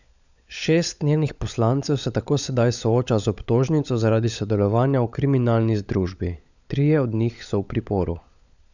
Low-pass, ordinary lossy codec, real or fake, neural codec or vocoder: 7.2 kHz; none; fake; codec, 16 kHz, 16 kbps, FunCodec, trained on LibriTTS, 50 frames a second